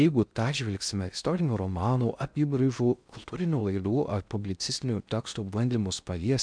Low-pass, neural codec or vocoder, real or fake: 9.9 kHz; codec, 16 kHz in and 24 kHz out, 0.6 kbps, FocalCodec, streaming, 2048 codes; fake